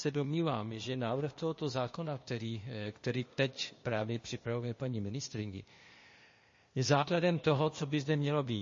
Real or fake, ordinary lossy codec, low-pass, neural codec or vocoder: fake; MP3, 32 kbps; 7.2 kHz; codec, 16 kHz, 0.8 kbps, ZipCodec